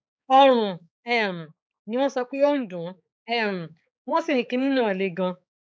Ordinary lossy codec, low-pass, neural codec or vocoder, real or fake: none; none; codec, 16 kHz, 4 kbps, X-Codec, HuBERT features, trained on balanced general audio; fake